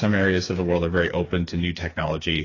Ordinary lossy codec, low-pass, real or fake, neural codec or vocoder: AAC, 32 kbps; 7.2 kHz; fake; codec, 16 kHz, 4 kbps, FreqCodec, smaller model